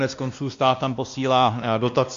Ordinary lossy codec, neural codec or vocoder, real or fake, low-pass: MP3, 64 kbps; codec, 16 kHz, 1 kbps, X-Codec, WavLM features, trained on Multilingual LibriSpeech; fake; 7.2 kHz